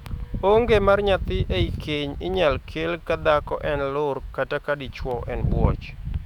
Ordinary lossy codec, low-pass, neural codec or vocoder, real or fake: none; 19.8 kHz; autoencoder, 48 kHz, 128 numbers a frame, DAC-VAE, trained on Japanese speech; fake